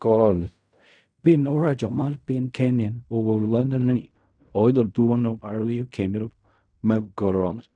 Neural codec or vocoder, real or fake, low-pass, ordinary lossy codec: codec, 16 kHz in and 24 kHz out, 0.4 kbps, LongCat-Audio-Codec, fine tuned four codebook decoder; fake; 9.9 kHz; none